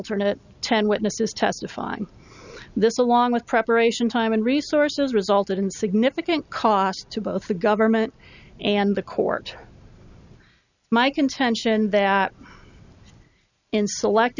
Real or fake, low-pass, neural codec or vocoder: real; 7.2 kHz; none